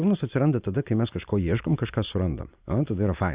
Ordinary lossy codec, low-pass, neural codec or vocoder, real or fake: Opus, 64 kbps; 3.6 kHz; none; real